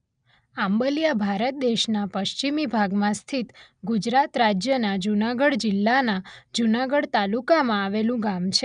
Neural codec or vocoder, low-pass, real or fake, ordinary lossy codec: none; 9.9 kHz; real; none